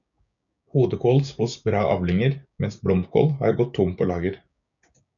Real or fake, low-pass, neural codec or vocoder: fake; 7.2 kHz; codec, 16 kHz, 6 kbps, DAC